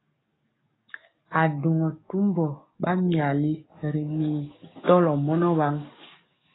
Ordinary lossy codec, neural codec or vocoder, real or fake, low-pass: AAC, 16 kbps; codec, 44.1 kHz, 7.8 kbps, DAC; fake; 7.2 kHz